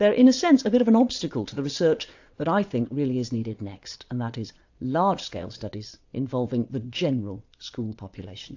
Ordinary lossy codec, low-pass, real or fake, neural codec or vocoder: AAC, 48 kbps; 7.2 kHz; fake; vocoder, 22.05 kHz, 80 mel bands, WaveNeXt